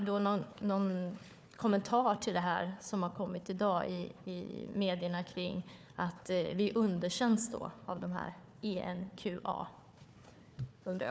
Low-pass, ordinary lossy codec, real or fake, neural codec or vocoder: none; none; fake; codec, 16 kHz, 4 kbps, FunCodec, trained on Chinese and English, 50 frames a second